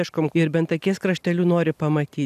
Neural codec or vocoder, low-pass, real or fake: none; 14.4 kHz; real